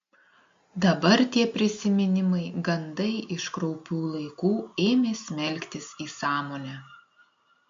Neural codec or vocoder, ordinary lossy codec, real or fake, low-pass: none; MP3, 48 kbps; real; 7.2 kHz